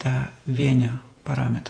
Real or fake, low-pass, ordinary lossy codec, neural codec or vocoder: fake; 9.9 kHz; AAC, 48 kbps; vocoder, 48 kHz, 128 mel bands, Vocos